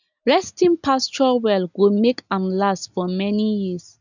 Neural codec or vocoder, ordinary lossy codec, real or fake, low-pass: none; none; real; 7.2 kHz